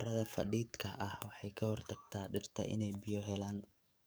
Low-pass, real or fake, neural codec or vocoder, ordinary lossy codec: none; fake; codec, 44.1 kHz, 7.8 kbps, DAC; none